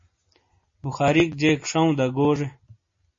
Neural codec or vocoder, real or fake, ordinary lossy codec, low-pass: none; real; MP3, 32 kbps; 7.2 kHz